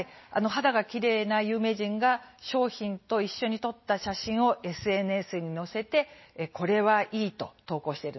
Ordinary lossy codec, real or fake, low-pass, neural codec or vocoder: MP3, 24 kbps; real; 7.2 kHz; none